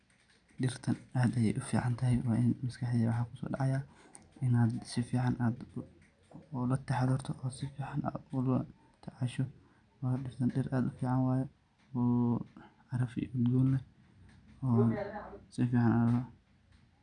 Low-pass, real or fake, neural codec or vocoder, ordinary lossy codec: 9.9 kHz; real; none; none